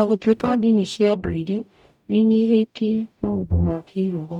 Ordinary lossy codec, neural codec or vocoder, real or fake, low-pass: none; codec, 44.1 kHz, 0.9 kbps, DAC; fake; 19.8 kHz